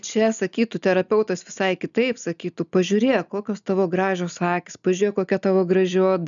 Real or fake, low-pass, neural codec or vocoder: real; 7.2 kHz; none